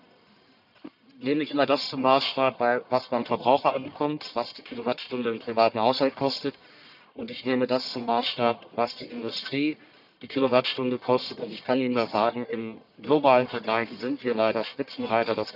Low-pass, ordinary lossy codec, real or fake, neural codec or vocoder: 5.4 kHz; none; fake; codec, 44.1 kHz, 1.7 kbps, Pupu-Codec